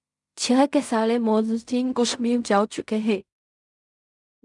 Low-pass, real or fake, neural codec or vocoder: 10.8 kHz; fake; codec, 16 kHz in and 24 kHz out, 0.4 kbps, LongCat-Audio-Codec, fine tuned four codebook decoder